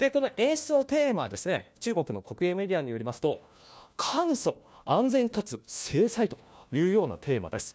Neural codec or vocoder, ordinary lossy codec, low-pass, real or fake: codec, 16 kHz, 1 kbps, FunCodec, trained on LibriTTS, 50 frames a second; none; none; fake